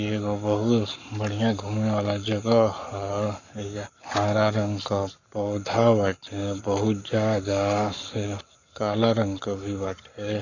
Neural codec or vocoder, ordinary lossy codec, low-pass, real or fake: codec, 44.1 kHz, 7.8 kbps, Pupu-Codec; none; 7.2 kHz; fake